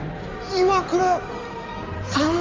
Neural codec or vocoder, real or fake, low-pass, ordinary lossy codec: codec, 16 kHz in and 24 kHz out, 2.2 kbps, FireRedTTS-2 codec; fake; 7.2 kHz; Opus, 32 kbps